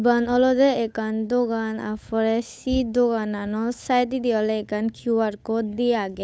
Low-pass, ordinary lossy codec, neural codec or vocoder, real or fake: none; none; codec, 16 kHz, 8 kbps, FunCodec, trained on Chinese and English, 25 frames a second; fake